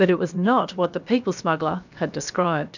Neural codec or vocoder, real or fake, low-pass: codec, 16 kHz, about 1 kbps, DyCAST, with the encoder's durations; fake; 7.2 kHz